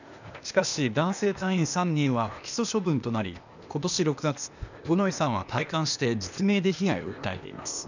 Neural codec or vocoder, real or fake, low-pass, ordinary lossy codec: codec, 16 kHz, 0.8 kbps, ZipCodec; fake; 7.2 kHz; none